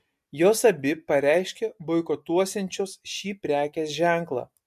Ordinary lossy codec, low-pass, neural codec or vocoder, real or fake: MP3, 64 kbps; 14.4 kHz; none; real